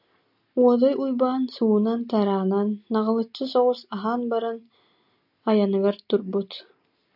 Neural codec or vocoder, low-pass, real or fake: none; 5.4 kHz; real